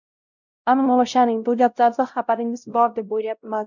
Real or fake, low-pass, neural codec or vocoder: fake; 7.2 kHz; codec, 16 kHz, 0.5 kbps, X-Codec, WavLM features, trained on Multilingual LibriSpeech